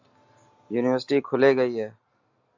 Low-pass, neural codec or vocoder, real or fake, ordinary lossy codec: 7.2 kHz; none; real; AAC, 48 kbps